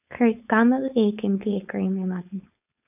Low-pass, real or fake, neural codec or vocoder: 3.6 kHz; fake; codec, 16 kHz, 4.8 kbps, FACodec